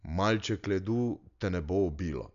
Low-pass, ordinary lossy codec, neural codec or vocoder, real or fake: 7.2 kHz; none; none; real